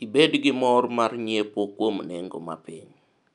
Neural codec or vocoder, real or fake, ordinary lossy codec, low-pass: none; real; none; 10.8 kHz